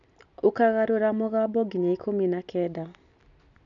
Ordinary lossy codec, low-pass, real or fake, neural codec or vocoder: none; 7.2 kHz; real; none